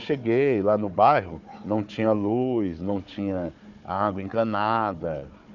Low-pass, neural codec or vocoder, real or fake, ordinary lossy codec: 7.2 kHz; codec, 16 kHz, 4 kbps, FunCodec, trained on Chinese and English, 50 frames a second; fake; none